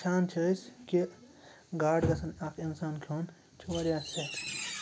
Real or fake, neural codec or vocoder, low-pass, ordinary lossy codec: real; none; none; none